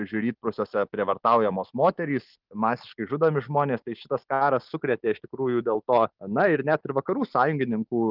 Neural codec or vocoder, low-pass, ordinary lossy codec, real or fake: none; 5.4 kHz; Opus, 32 kbps; real